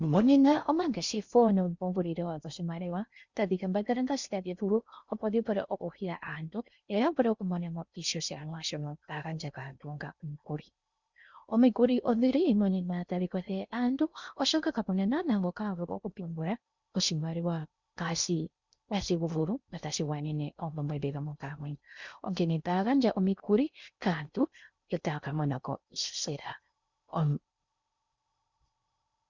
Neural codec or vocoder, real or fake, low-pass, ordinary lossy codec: codec, 16 kHz in and 24 kHz out, 0.6 kbps, FocalCodec, streaming, 4096 codes; fake; 7.2 kHz; Opus, 64 kbps